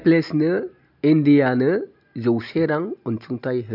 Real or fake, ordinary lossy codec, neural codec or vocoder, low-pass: real; none; none; 5.4 kHz